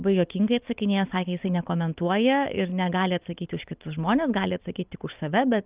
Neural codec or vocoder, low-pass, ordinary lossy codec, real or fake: none; 3.6 kHz; Opus, 24 kbps; real